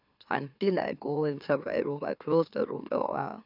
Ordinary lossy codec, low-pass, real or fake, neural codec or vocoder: none; 5.4 kHz; fake; autoencoder, 44.1 kHz, a latent of 192 numbers a frame, MeloTTS